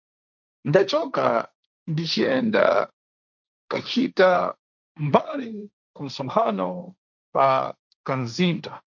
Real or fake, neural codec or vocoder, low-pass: fake; codec, 16 kHz, 1.1 kbps, Voila-Tokenizer; 7.2 kHz